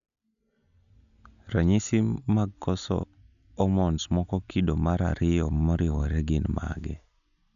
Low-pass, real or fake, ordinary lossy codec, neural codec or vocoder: 7.2 kHz; real; none; none